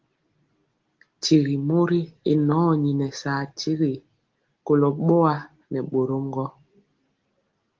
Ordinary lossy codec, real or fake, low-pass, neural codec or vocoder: Opus, 16 kbps; real; 7.2 kHz; none